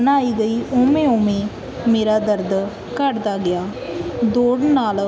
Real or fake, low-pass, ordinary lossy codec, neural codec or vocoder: real; none; none; none